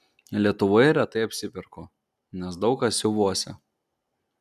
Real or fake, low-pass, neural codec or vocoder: real; 14.4 kHz; none